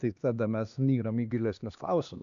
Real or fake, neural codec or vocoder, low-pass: fake; codec, 16 kHz, 2 kbps, X-Codec, HuBERT features, trained on LibriSpeech; 7.2 kHz